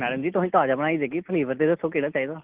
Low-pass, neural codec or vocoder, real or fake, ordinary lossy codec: 3.6 kHz; none; real; Opus, 16 kbps